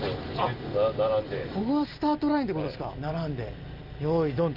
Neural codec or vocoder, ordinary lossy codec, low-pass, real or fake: none; Opus, 24 kbps; 5.4 kHz; real